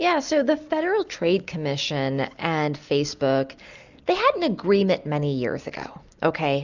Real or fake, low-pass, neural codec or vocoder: real; 7.2 kHz; none